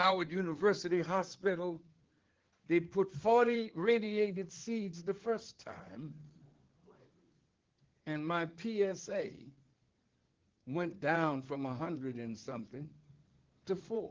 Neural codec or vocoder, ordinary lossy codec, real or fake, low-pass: codec, 16 kHz in and 24 kHz out, 2.2 kbps, FireRedTTS-2 codec; Opus, 16 kbps; fake; 7.2 kHz